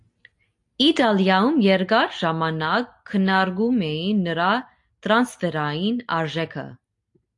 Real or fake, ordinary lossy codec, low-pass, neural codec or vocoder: real; AAC, 64 kbps; 10.8 kHz; none